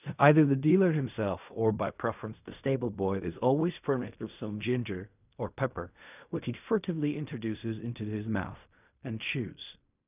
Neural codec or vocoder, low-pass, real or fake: codec, 16 kHz in and 24 kHz out, 0.4 kbps, LongCat-Audio-Codec, fine tuned four codebook decoder; 3.6 kHz; fake